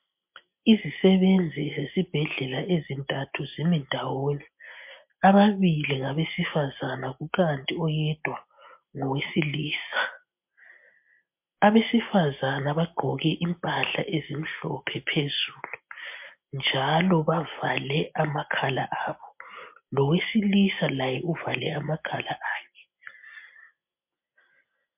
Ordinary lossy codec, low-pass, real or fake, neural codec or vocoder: MP3, 32 kbps; 3.6 kHz; real; none